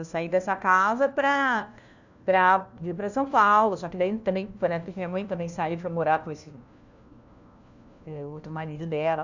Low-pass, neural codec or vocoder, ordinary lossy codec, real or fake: 7.2 kHz; codec, 16 kHz, 1 kbps, FunCodec, trained on LibriTTS, 50 frames a second; none; fake